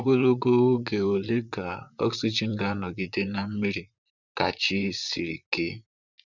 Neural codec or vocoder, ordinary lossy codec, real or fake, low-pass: vocoder, 44.1 kHz, 128 mel bands, Pupu-Vocoder; none; fake; 7.2 kHz